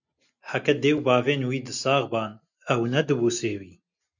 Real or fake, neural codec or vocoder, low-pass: real; none; 7.2 kHz